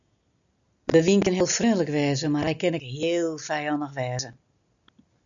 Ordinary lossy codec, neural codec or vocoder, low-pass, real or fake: MP3, 96 kbps; none; 7.2 kHz; real